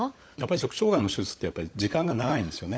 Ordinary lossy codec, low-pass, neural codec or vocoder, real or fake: none; none; codec, 16 kHz, 16 kbps, FunCodec, trained on LibriTTS, 50 frames a second; fake